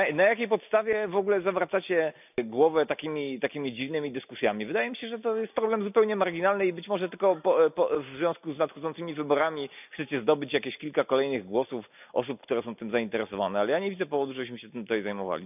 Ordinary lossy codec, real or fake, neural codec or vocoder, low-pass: none; real; none; 3.6 kHz